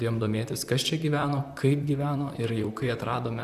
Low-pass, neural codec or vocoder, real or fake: 14.4 kHz; vocoder, 44.1 kHz, 128 mel bands, Pupu-Vocoder; fake